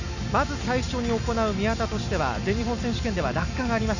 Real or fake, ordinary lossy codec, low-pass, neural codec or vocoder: real; none; 7.2 kHz; none